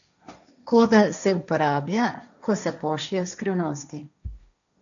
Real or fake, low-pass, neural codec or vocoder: fake; 7.2 kHz; codec, 16 kHz, 1.1 kbps, Voila-Tokenizer